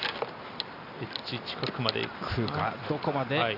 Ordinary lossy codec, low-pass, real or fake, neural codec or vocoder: none; 5.4 kHz; real; none